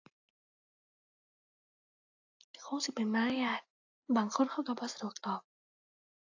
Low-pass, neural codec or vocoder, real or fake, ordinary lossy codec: 7.2 kHz; none; real; AAC, 48 kbps